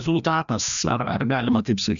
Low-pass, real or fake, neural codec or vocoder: 7.2 kHz; fake; codec, 16 kHz, 1 kbps, FreqCodec, larger model